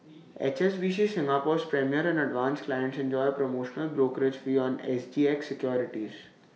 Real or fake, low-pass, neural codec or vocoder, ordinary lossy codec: real; none; none; none